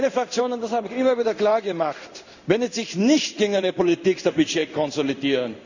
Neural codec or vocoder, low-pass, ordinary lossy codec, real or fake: codec, 16 kHz in and 24 kHz out, 1 kbps, XY-Tokenizer; 7.2 kHz; none; fake